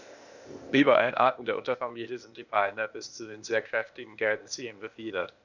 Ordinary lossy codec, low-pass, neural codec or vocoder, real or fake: none; 7.2 kHz; codec, 16 kHz, 0.8 kbps, ZipCodec; fake